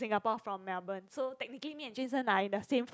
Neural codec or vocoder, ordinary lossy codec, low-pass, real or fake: none; none; none; real